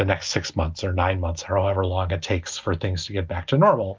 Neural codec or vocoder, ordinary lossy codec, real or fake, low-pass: none; Opus, 24 kbps; real; 7.2 kHz